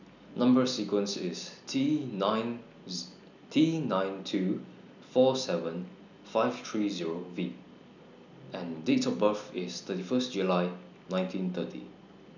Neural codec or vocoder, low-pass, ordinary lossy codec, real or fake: none; 7.2 kHz; none; real